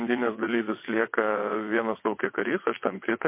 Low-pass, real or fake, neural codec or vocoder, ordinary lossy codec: 3.6 kHz; fake; vocoder, 22.05 kHz, 80 mel bands, WaveNeXt; MP3, 24 kbps